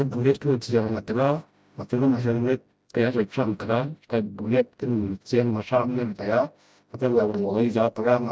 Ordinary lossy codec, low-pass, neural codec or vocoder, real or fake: none; none; codec, 16 kHz, 0.5 kbps, FreqCodec, smaller model; fake